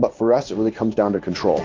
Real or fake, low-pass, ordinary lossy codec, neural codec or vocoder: fake; 7.2 kHz; Opus, 24 kbps; codec, 16 kHz in and 24 kHz out, 1 kbps, XY-Tokenizer